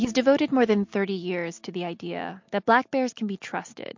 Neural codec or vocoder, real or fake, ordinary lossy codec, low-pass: none; real; MP3, 48 kbps; 7.2 kHz